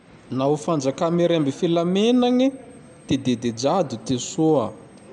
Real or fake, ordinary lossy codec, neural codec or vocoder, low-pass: real; none; none; 10.8 kHz